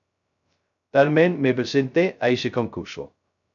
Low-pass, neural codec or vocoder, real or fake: 7.2 kHz; codec, 16 kHz, 0.2 kbps, FocalCodec; fake